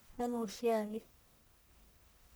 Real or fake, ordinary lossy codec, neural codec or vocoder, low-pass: fake; none; codec, 44.1 kHz, 1.7 kbps, Pupu-Codec; none